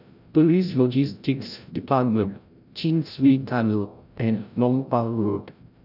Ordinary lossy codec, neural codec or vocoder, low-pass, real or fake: none; codec, 16 kHz, 0.5 kbps, FreqCodec, larger model; 5.4 kHz; fake